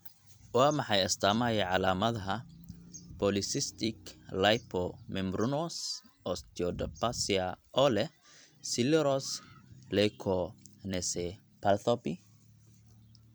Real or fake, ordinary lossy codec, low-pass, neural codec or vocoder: fake; none; none; vocoder, 44.1 kHz, 128 mel bands every 256 samples, BigVGAN v2